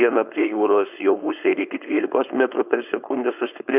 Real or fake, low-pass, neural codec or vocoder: fake; 3.6 kHz; codec, 16 kHz, 4.8 kbps, FACodec